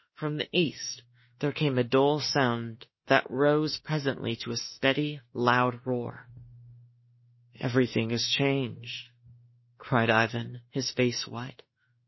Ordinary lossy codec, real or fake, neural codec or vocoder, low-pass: MP3, 24 kbps; fake; autoencoder, 48 kHz, 32 numbers a frame, DAC-VAE, trained on Japanese speech; 7.2 kHz